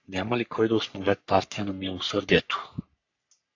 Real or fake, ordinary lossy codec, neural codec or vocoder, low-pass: fake; AAC, 48 kbps; codec, 44.1 kHz, 3.4 kbps, Pupu-Codec; 7.2 kHz